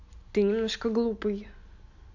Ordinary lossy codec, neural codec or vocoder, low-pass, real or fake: none; none; 7.2 kHz; real